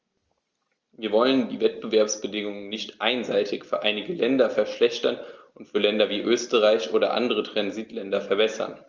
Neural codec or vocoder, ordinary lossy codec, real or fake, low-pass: none; Opus, 24 kbps; real; 7.2 kHz